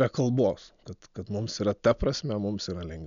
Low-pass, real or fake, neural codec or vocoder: 7.2 kHz; real; none